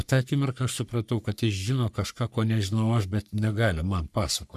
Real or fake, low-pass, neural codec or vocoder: fake; 14.4 kHz; codec, 44.1 kHz, 3.4 kbps, Pupu-Codec